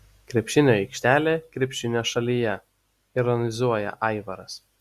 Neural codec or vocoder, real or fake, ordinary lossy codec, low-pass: none; real; Opus, 64 kbps; 14.4 kHz